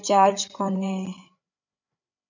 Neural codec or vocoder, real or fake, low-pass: codec, 16 kHz, 8 kbps, FreqCodec, larger model; fake; 7.2 kHz